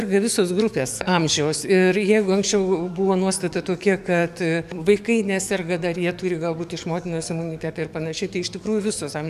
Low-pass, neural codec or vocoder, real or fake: 14.4 kHz; codec, 44.1 kHz, 7.8 kbps, DAC; fake